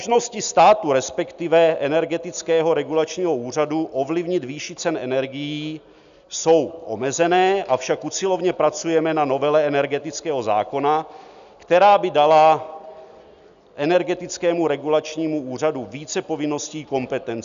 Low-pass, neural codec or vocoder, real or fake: 7.2 kHz; none; real